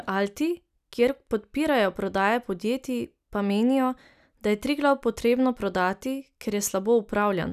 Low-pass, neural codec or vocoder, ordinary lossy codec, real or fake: 14.4 kHz; none; none; real